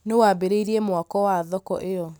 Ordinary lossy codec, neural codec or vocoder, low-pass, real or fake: none; none; none; real